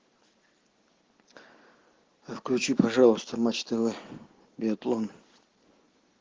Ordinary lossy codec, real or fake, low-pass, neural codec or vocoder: Opus, 16 kbps; real; 7.2 kHz; none